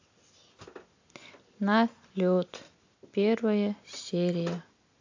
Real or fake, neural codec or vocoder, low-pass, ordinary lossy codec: real; none; 7.2 kHz; AAC, 48 kbps